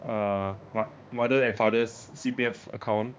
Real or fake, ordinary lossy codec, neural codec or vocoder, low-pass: fake; none; codec, 16 kHz, 2 kbps, X-Codec, HuBERT features, trained on balanced general audio; none